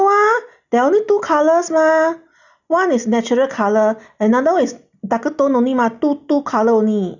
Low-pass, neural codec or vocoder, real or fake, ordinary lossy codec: 7.2 kHz; none; real; none